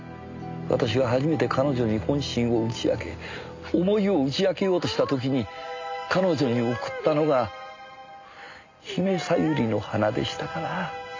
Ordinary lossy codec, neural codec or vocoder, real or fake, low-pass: MP3, 64 kbps; none; real; 7.2 kHz